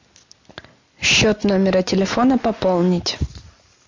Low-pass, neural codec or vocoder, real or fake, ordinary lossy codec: 7.2 kHz; none; real; MP3, 48 kbps